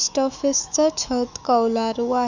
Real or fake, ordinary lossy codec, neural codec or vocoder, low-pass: fake; none; autoencoder, 48 kHz, 128 numbers a frame, DAC-VAE, trained on Japanese speech; 7.2 kHz